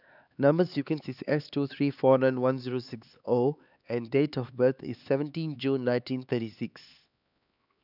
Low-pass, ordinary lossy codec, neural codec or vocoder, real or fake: 5.4 kHz; none; codec, 16 kHz, 4 kbps, X-Codec, HuBERT features, trained on LibriSpeech; fake